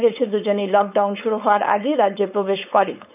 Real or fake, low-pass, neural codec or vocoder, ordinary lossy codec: fake; 3.6 kHz; codec, 16 kHz, 4.8 kbps, FACodec; none